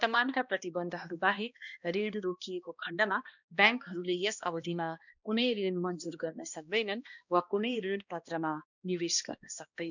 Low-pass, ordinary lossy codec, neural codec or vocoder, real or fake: 7.2 kHz; none; codec, 16 kHz, 1 kbps, X-Codec, HuBERT features, trained on balanced general audio; fake